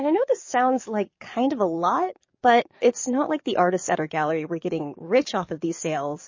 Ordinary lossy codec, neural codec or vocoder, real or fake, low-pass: MP3, 32 kbps; codec, 44.1 kHz, 7.8 kbps, DAC; fake; 7.2 kHz